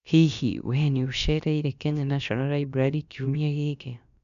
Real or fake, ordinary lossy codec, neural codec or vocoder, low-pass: fake; MP3, 96 kbps; codec, 16 kHz, about 1 kbps, DyCAST, with the encoder's durations; 7.2 kHz